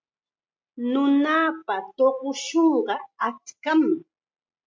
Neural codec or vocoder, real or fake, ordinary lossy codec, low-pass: none; real; MP3, 48 kbps; 7.2 kHz